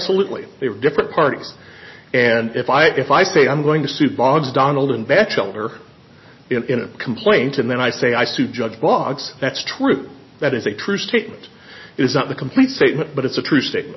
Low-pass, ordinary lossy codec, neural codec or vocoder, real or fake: 7.2 kHz; MP3, 24 kbps; none; real